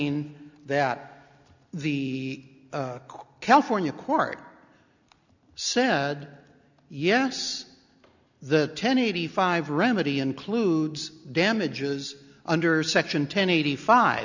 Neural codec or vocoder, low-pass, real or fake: none; 7.2 kHz; real